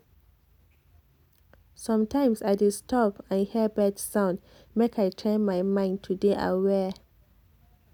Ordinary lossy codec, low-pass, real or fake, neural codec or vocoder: none; 19.8 kHz; real; none